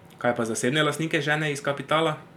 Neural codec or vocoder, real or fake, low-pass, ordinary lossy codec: none; real; 19.8 kHz; none